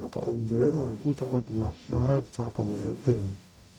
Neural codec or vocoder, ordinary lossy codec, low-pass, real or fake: codec, 44.1 kHz, 0.9 kbps, DAC; none; 19.8 kHz; fake